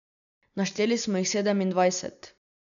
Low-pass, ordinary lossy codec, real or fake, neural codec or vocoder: 7.2 kHz; none; real; none